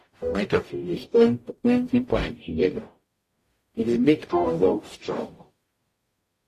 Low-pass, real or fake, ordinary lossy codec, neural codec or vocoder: 14.4 kHz; fake; AAC, 48 kbps; codec, 44.1 kHz, 0.9 kbps, DAC